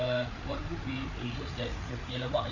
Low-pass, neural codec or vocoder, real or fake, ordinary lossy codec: 7.2 kHz; codec, 16 kHz, 8 kbps, FreqCodec, larger model; fake; none